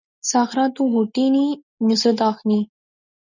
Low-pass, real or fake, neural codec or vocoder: 7.2 kHz; real; none